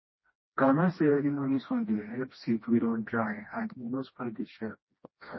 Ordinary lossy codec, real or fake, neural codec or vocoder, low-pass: MP3, 24 kbps; fake; codec, 16 kHz, 1 kbps, FreqCodec, smaller model; 7.2 kHz